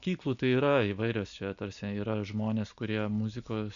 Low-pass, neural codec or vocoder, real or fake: 7.2 kHz; none; real